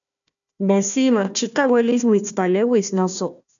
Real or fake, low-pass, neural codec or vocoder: fake; 7.2 kHz; codec, 16 kHz, 1 kbps, FunCodec, trained on Chinese and English, 50 frames a second